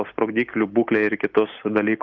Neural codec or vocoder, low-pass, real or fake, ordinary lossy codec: none; 7.2 kHz; real; Opus, 24 kbps